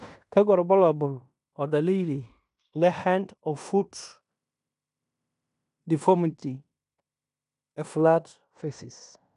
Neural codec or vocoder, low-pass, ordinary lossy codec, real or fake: codec, 16 kHz in and 24 kHz out, 0.9 kbps, LongCat-Audio-Codec, fine tuned four codebook decoder; 10.8 kHz; none; fake